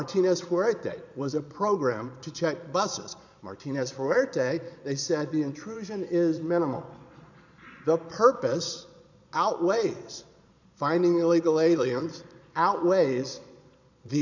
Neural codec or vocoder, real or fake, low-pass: vocoder, 22.05 kHz, 80 mel bands, Vocos; fake; 7.2 kHz